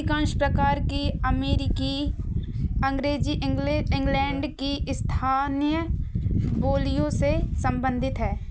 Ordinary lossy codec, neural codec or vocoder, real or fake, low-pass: none; none; real; none